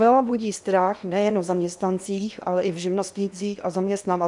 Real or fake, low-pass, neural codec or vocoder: fake; 10.8 kHz; codec, 16 kHz in and 24 kHz out, 0.8 kbps, FocalCodec, streaming, 65536 codes